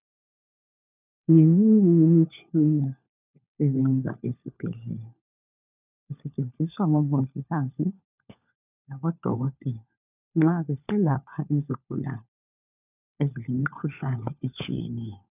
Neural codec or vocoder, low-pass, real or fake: codec, 16 kHz, 4 kbps, FunCodec, trained on LibriTTS, 50 frames a second; 3.6 kHz; fake